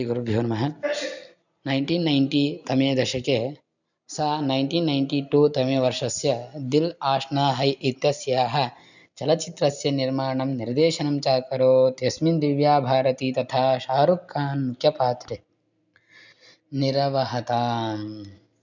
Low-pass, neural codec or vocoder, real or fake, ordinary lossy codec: 7.2 kHz; none; real; none